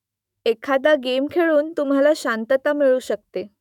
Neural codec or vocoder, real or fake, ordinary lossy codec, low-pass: autoencoder, 48 kHz, 128 numbers a frame, DAC-VAE, trained on Japanese speech; fake; none; 19.8 kHz